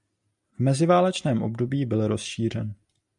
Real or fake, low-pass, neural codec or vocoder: real; 10.8 kHz; none